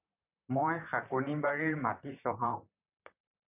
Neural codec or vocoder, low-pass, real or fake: vocoder, 44.1 kHz, 128 mel bands, Pupu-Vocoder; 3.6 kHz; fake